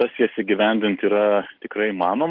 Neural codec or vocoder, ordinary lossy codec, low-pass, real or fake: none; Opus, 16 kbps; 5.4 kHz; real